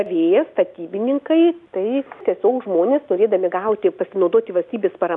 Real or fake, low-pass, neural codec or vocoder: real; 10.8 kHz; none